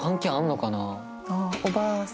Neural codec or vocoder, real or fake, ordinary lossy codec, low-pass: none; real; none; none